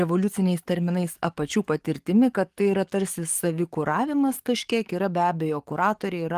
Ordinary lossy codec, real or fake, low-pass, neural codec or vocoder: Opus, 24 kbps; fake; 14.4 kHz; codec, 44.1 kHz, 7.8 kbps, DAC